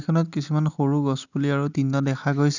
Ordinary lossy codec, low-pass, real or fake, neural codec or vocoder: none; 7.2 kHz; real; none